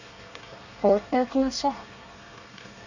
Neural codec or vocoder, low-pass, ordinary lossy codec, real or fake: codec, 24 kHz, 1 kbps, SNAC; 7.2 kHz; none; fake